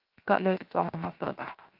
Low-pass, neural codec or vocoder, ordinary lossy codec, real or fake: 5.4 kHz; codec, 16 kHz, 0.7 kbps, FocalCodec; Opus, 32 kbps; fake